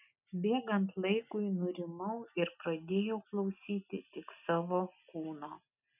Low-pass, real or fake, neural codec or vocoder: 3.6 kHz; real; none